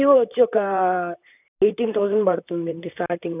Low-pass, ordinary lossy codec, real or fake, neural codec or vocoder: 3.6 kHz; none; fake; vocoder, 44.1 kHz, 128 mel bands, Pupu-Vocoder